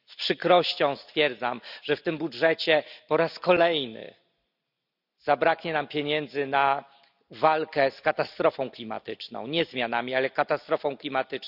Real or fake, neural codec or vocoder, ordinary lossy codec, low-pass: real; none; none; 5.4 kHz